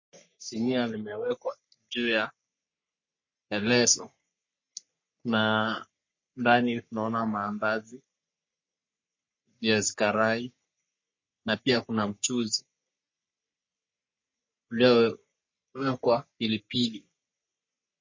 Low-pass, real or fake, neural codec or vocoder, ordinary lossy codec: 7.2 kHz; fake; codec, 44.1 kHz, 3.4 kbps, Pupu-Codec; MP3, 32 kbps